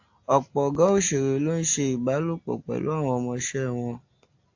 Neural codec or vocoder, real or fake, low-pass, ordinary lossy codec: none; real; 7.2 kHz; AAC, 48 kbps